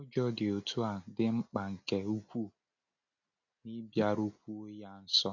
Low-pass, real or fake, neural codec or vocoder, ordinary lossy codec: 7.2 kHz; real; none; none